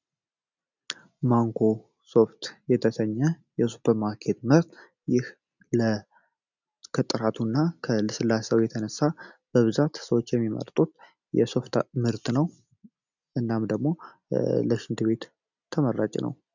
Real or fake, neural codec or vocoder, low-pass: real; none; 7.2 kHz